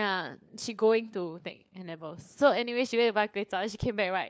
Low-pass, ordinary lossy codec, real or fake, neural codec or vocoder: none; none; fake; codec, 16 kHz, 4 kbps, FunCodec, trained on LibriTTS, 50 frames a second